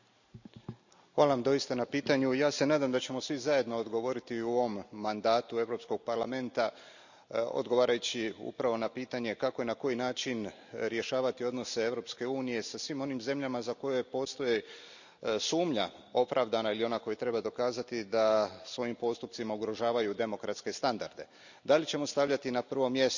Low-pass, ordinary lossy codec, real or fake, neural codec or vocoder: 7.2 kHz; none; real; none